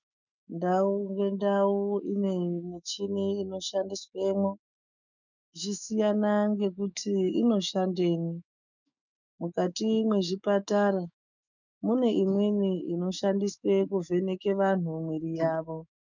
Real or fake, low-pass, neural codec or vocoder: fake; 7.2 kHz; autoencoder, 48 kHz, 128 numbers a frame, DAC-VAE, trained on Japanese speech